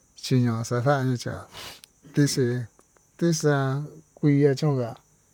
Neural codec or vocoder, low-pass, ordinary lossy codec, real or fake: vocoder, 44.1 kHz, 128 mel bands, Pupu-Vocoder; 19.8 kHz; none; fake